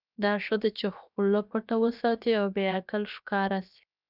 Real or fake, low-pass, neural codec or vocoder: fake; 5.4 kHz; codec, 16 kHz, 0.7 kbps, FocalCodec